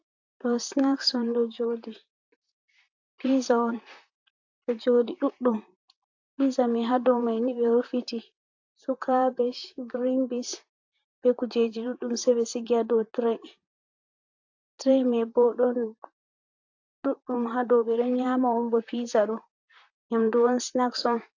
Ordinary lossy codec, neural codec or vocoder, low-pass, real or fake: AAC, 48 kbps; vocoder, 44.1 kHz, 128 mel bands, Pupu-Vocoder; 7.2 kHz; fake